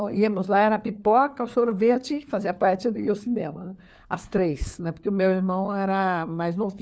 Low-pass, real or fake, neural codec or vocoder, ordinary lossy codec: none; fake; codec, 16 kHz, 4 kbps, FunCodec, trained on LibriTTS, 50 frames a second; none